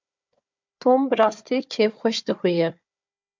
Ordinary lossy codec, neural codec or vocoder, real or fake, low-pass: MP3, 64 kbps; codec, 16 kHz, 4 kbps, FunCodec, trained on Chinese and English, 50 frames a second; fake; 7.2 kHz